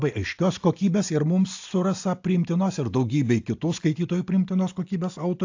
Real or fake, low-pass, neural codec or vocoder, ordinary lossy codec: real; 7.2 kHz; none; AAC, 48 kbps